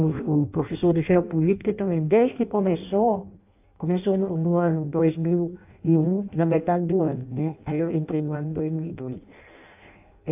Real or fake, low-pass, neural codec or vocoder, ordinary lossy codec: fake; 3.6 kHz; codec, 16 kHz in and 24 kHz out, 0.6 kbps, FireRedTTS-2 codec; AAC, 32 kbps